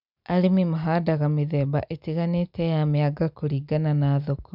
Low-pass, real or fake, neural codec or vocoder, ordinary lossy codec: 5.4 kHz; real; none; none